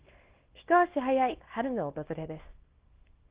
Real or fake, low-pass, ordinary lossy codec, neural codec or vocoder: fake; 3.6 kHz; Opus, 16 kbps; codec, 24 kHz, 0.9 kbps, WavTokenizer, small release